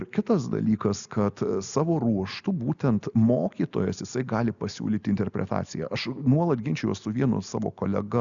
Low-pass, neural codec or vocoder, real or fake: 7.2 kHz; none; real